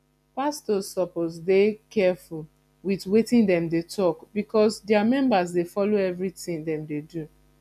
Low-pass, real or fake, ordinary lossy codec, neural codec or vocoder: 14.4 kHz; real; none; none